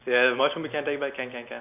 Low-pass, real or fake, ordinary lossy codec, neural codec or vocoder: 3.6 kHz; real; none; none